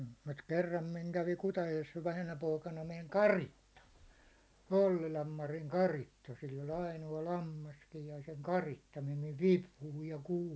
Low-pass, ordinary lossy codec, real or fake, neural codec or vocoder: none; none; real; none